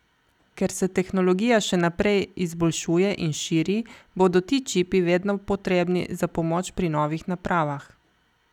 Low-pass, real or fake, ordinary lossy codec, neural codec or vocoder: 19.8 kHz; real; none; none